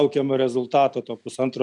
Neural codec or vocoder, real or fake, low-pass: none; real; 10.8 kHz